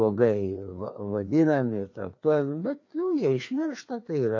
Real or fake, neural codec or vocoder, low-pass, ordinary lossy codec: fake; codec, 16 kHz, 2 kbps, FreqCodec, larger model; 7.2 kHz; AAC, 48 kbps